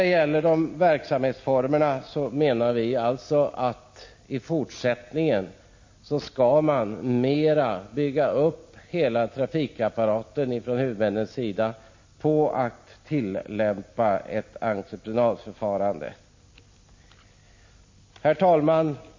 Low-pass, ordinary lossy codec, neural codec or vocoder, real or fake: 7.2 kHz; MP3, 32 kbps; none; real